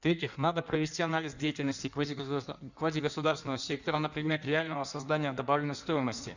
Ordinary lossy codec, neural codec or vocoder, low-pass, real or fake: none; codec, 16 kHz in and 24 kHz out, 1.1 kbps, FireRedTTS-2 codec; 7.2 kHz; fake